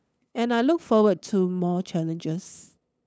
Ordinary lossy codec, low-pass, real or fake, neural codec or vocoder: none; none; fake; codec, 16 kHz, 8 kbps, FunCodec, trained on LibriTTS, 25 frames a second